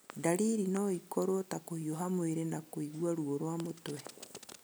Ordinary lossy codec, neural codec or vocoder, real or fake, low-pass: none; none; real; none